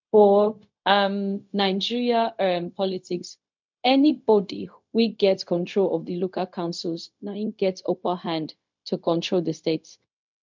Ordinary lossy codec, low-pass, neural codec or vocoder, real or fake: MP3, 64 kbps; 7.2 kHz; codec, 16 kHz, 0.4 kbps, LongCat-Audio-Codec; fake